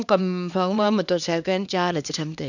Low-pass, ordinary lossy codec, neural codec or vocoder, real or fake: 7.2 kHz; none; codec, 16 kHz, 2 kbps, X-Codec, HuBERT features, trained on LibriSpeech; fake